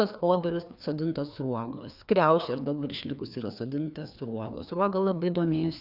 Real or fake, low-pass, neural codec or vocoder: fake; 5.4 kHz; codec, 16 kHz, 2 kbps, FreqCodec, larger model